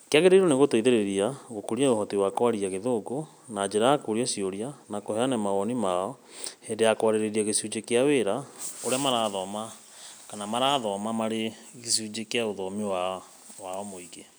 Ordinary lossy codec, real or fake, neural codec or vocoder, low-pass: none; real; none; none